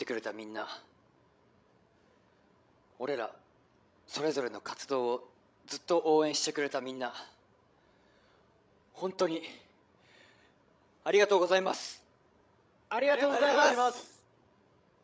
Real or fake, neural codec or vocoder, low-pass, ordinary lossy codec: fake; codec, 16 kHz, 16 kbps, FreqCodec, larger model; none; none